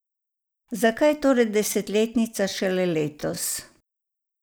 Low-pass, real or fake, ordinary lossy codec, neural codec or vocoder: none; real; none; none